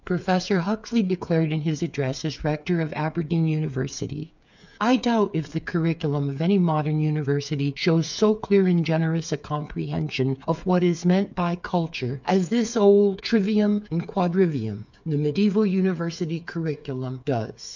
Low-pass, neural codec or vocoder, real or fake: 7.2 kHz; codec, 16 kHz, 8 kbps, FreqCodec, smaller model; fake